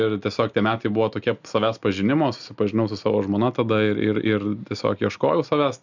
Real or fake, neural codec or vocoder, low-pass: real; none; 7.2 kHz